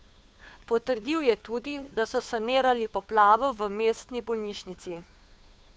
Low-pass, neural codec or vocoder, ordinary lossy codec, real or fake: none; codec, 16 kHz, 2 kbps, FunCodec, trained on Chinese and English, 25 frames a second; none; fake